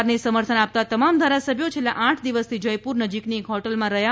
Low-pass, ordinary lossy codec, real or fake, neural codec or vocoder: none; none; real; none